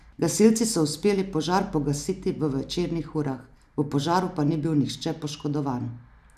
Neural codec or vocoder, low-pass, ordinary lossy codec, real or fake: none; 14.4 kHz; none; real